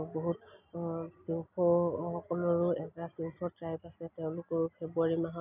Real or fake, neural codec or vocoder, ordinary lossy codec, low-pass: real; none; none; 3.6 kHz